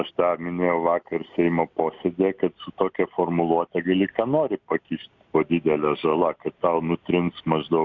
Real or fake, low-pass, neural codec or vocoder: real; 7.2 kHz; none